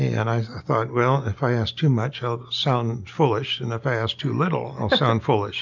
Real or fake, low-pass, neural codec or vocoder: real; 7.2 kHz; none